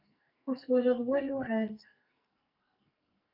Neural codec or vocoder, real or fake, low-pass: codec, 44.1 kHz, 2.6 kbps, SNAC; fake; 5.4 kHz